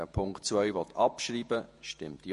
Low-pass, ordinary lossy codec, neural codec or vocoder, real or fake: 14.4 kHz; MP3, 48 kbps; vocoder, 48 kHz, 128 mel bands, Vocos; fake